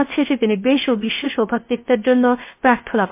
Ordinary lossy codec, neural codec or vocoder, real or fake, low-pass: MP3, 24 kbps; codec, 16 kHz, 0.3 kbps, FocalCodec; fake; 3.6 kHz